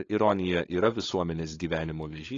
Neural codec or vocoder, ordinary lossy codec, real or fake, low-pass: codec, 16 kHz, 8 kbps, FunCodec, trained on LibriTTS, 25 frames a second; AAC, 32 kbps; fake; 7.2 kHz